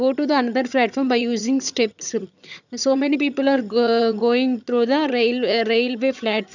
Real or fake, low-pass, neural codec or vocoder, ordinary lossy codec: fake; 7.2 kHz; vocoder, 22.05 kHz, 80 mel bands, HiFi-GAN; none